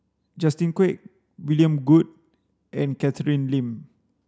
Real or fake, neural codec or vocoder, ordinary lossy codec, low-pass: real; none; none; none